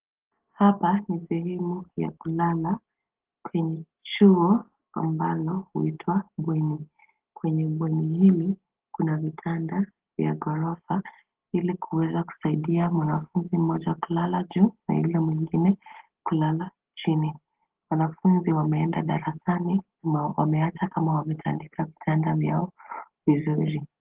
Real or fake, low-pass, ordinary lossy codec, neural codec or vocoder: real; 3.6 kHz; Opus, 16 kbps; none